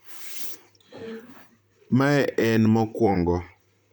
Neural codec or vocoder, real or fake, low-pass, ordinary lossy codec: vocoder, 44.1 kHz, 128 mel bands, Pupu-Vocoder; fake; none; none